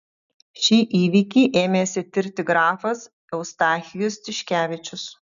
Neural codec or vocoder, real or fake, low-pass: none; real; 7.2 kHz